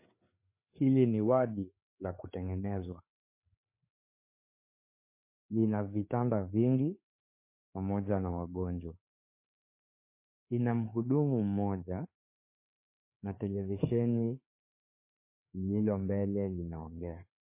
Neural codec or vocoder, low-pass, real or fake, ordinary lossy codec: codec, 16 kHz, 4 kbps, FreqCodec, larger model; 3.6 kHz; fake; MP3, 24 kbps